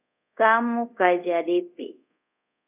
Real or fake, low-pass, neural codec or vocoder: fake; 3.6 kHz; codec, 24 kHz, 0.5 kbps, DualCodec